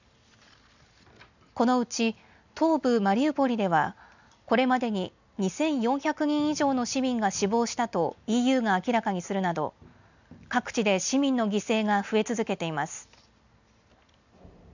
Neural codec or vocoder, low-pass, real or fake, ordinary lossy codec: none; 7.2 kHz; real; none